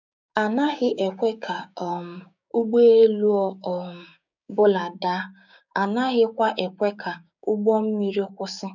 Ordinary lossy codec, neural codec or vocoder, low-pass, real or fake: none; codec, 16 kHz, 6 kbps, DAC; 7.2 kHz; fake